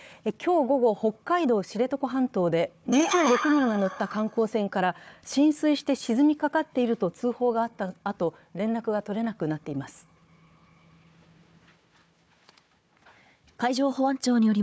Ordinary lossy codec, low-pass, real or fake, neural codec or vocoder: none; none; fake; codec, 16 kHz, 4 kbps, FunCodec, trained on Chinese and English, 50 frames a second